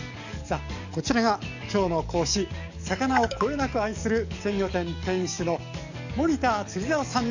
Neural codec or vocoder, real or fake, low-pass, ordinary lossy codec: codec, 44.1 kHz, 7.8 kbps, DAC; fake; 7.2 kHz; none